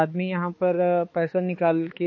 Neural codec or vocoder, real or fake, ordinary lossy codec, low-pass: codec, 16 kHz, 4 kbps, X-Codec, HuBERT features, trained on balanced general audio; fake; MP3, 32 kbps; 7.2 kHz